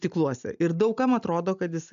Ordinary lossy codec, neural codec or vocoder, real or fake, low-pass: MP3, 64 kbps; none; real; 7.2 kHz